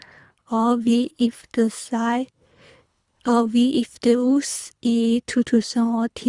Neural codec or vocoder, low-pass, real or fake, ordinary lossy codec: codec, 24 kHz, 3 kbps, HILCodec; 10.8 kHz; fake; Opus, 64 kbps